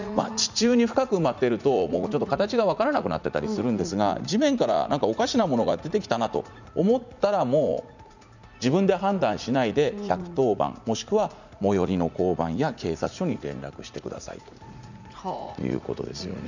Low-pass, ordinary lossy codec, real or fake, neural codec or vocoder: 7.2 kHz; none; fake; vocoder, 44.1 kHz, 80 mel bands, Vocos